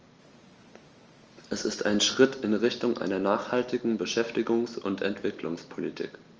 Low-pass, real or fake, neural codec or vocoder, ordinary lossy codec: 7.2 kHz; real; none; Opus, 24 kbps